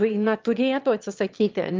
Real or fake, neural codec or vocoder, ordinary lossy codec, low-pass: fake; autoencoder, 22.05 kHz, a latent of 192 numbers a frame, VITS, trained on one speaker; Opus, 32 kbps; 7.2 kHz